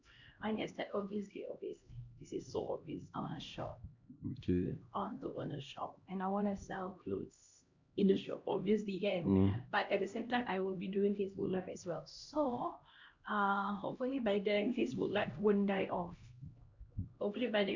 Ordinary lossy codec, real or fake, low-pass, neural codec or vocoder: Opus, 64 kbps; fake; 7.2 kHz; codec, 16 kHz, 1 kbps, X-Codec, HuBERT features, trained on LibriSpeech